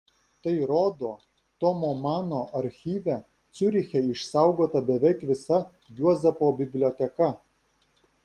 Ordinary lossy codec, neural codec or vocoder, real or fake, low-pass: Opus, 24 kbps; none; real; 14.4 kHz